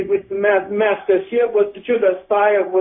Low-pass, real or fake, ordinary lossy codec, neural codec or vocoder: 7.2 kHz; fake; MP3, 24 kbps; codec, 16 kHz, 0.4 kbps, LongCat-Audio-Codec